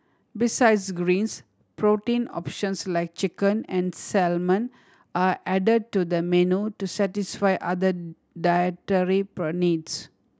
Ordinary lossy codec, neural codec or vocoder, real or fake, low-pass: none; none; real; none